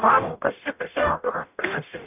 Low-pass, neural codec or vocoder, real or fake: 3.6 kHz; codec, 44.1 kHz, 0.9 kbps, DAC; fake